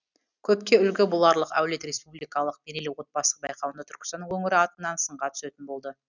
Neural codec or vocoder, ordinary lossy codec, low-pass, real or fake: none; none; 7.2 kHz; real